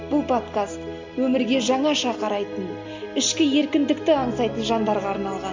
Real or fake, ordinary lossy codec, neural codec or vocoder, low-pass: real; MP3, 48 kbps; none; 7.2 kHz